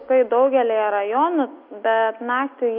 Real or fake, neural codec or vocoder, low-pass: real; none; 5.4 kHz